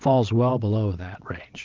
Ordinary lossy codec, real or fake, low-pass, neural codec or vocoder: Opus, 32 kbps; fake; 7.2 kHz; vocoder, 22.05 kHz, 80 mel bands, WaveNeXt